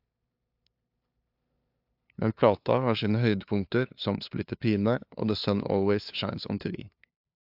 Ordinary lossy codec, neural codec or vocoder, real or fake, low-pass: AAC, 48 kbps; codec, 16 kHz, 2 kbps, FunCodec, trained on LibriTTS, 25 frames a second; fake; 5.4 kHz